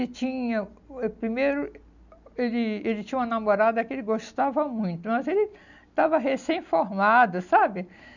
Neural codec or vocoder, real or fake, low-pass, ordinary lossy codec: none; real; 7.2 kHz; none